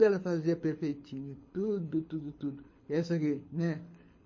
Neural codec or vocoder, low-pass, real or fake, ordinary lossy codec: codec, 24 kHz, 6 kbps, HILCodec; 7.2 kHz; fake; MP3, 32 kbps